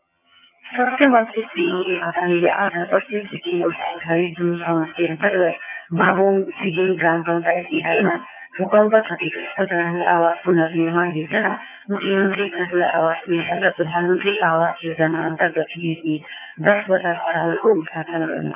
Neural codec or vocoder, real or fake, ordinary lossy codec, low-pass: vocoder, 22.05 kHz, 80 mel bands, HiFi-GAN; fake; none; 3.6 kHz